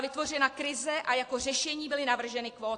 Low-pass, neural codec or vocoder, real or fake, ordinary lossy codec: 9.9 kHz; none; real; AAC, 48 kbps